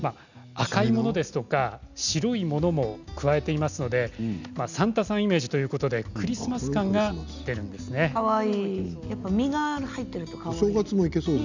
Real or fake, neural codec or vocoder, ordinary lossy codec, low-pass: real; none; none; 7.2 kHz